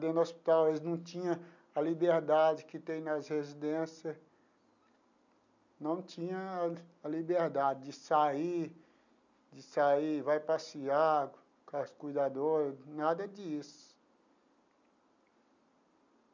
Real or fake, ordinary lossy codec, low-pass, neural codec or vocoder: real; none; 7.2 kHz; none